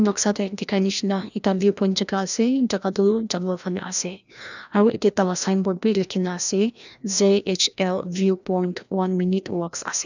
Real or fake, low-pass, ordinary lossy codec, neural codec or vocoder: fake; 7.2 kHz; none; codec, 16 kHz, 1 kbps, FreqCodec, larger model